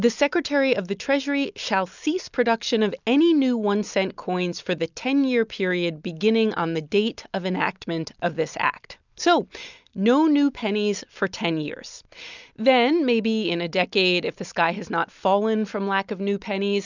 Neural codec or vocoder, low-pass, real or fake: none; 7.2 kHz; real